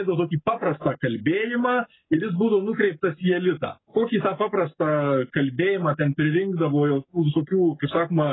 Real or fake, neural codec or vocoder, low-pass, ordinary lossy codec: fake; codec, 44.1 kHz, 7.8 kbps, Pupu-Codec; 7.2 kHz; AAC, 16 kbps